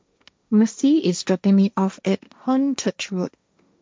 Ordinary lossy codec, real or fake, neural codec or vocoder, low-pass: none; fake; codec, 16 kHz, 1.1 kbps, Voila-Tokenizer; none